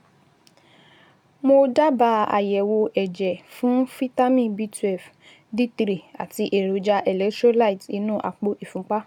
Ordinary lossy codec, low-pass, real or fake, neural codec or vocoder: none; 19.8 kHz; real; none